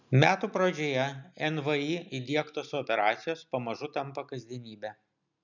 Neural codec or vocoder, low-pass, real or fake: none; 7.2 kHz; real